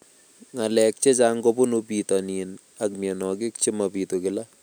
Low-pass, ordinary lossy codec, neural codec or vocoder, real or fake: none; none; none; real